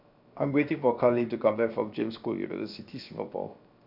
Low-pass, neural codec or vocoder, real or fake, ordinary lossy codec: 5.4 kHz; codec, 16 kHz, 0.7 kbps, FocalCodec; fake; none